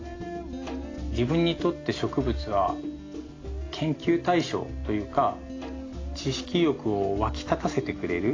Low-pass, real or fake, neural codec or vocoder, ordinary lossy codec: 7.2 kHz; real; none; AAC, 32 kbps